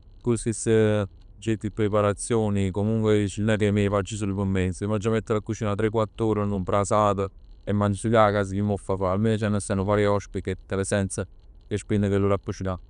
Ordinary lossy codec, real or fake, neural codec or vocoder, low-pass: none; real; none; 10.8 kHz